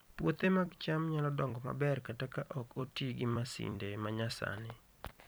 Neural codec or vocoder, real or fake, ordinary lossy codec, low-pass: vocoder, 44.1 kHz, 128 mel bands every 256 samples, BigVGAN v2; fake; none; none